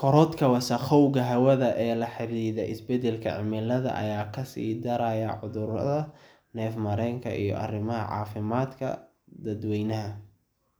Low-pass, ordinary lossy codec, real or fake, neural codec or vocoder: none; none; real; none